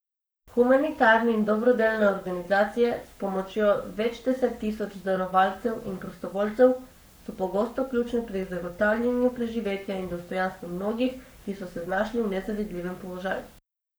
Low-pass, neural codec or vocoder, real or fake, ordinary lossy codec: none; codec, 44.1 kHz, 7.8 kbps, Pupu-Codec; fake; none